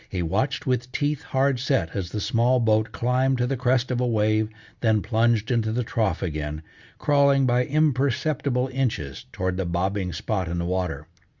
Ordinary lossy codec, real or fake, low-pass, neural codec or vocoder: Opus, 64 kbps; real; 7.2 kHz; none